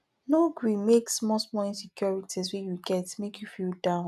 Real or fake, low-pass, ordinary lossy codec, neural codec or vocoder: real; 14.4 kHz; none; none